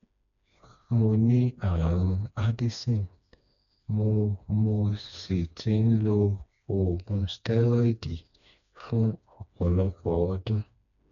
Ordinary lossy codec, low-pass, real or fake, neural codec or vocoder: none; 7.2 kHz; fake; codec, 16 kHz, 2 kbps, FreqCodec, smaller model